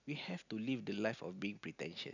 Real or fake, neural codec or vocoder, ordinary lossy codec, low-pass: real; none; none; 7.2 kHz